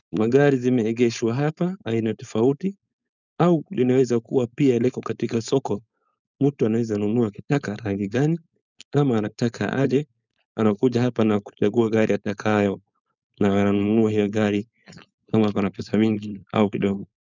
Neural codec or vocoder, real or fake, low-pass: codec, 16 kHz, 4.8 kbps, FACodec; fake; 7.2 kHz